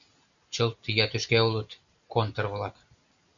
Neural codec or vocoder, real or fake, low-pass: none; real; 7.2 kHz